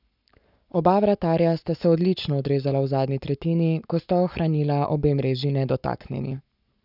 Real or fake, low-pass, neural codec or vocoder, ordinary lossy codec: fake; 5.4 kHz; codec, 44.1 kHz, 7.8 kbps, Pupu-Codec; none